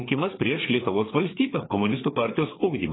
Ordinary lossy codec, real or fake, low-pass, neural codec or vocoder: AAC, 16 kbps; fake; 7.2 kHz; codec, 16 kHz, 4 kbps, FreqCodec, larger model